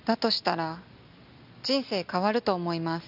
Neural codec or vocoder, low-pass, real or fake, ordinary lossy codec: none; 5.4 kHz; real; AAC, 48 kbps